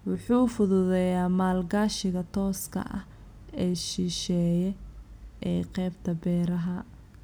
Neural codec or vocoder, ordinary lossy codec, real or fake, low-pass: none; none; real; none